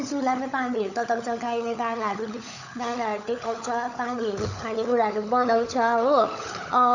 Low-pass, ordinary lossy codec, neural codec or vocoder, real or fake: 7.2 kHz; none; codec, 16 kHz, 16 kbps, FunCodec, trained on LibriTTS, 50 frames a second; fake